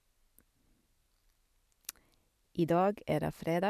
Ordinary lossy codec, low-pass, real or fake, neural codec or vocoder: none; 14.4 kHz; real; none